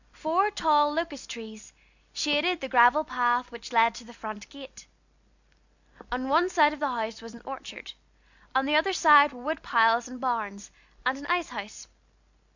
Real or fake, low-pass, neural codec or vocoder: real; 7.2 kHz; none